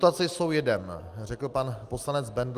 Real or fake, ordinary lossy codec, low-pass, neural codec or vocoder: real; Opus, 32 kbps; 14.4 kHz; none